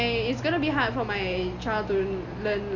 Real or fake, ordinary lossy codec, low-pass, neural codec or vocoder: real; none; 7.2 kHz; none